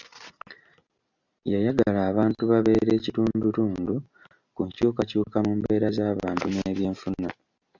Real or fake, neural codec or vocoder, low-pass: real; none; 7.2 kHz